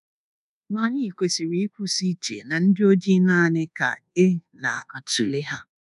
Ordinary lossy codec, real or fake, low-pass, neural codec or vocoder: none; fake; 10.8 kHz; codec, 24 kHz, 1.2 kbps, DualCodec